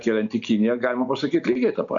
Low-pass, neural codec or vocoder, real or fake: 7.2 kHz; none; real